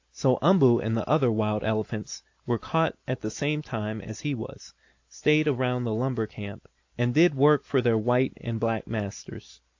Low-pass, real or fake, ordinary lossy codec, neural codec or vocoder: 7.2 kHz; real; AAC, 48 kbps; none